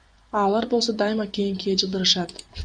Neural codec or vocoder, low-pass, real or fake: vocoder, 24 kHz, 100 mel bands, Vocos; 9.9 kHz; fake